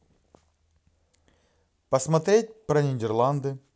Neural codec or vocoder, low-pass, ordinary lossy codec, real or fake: none; none; none; real